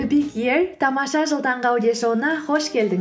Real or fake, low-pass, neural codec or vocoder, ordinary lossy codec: real; none; none; none